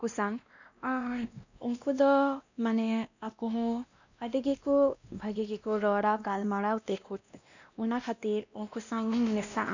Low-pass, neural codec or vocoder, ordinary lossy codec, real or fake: 7.2 kHz; codec, 16 kHz, 1 kbps, X-Codec, WavLM features, trained on Multilingual LibriSpeech; none; fake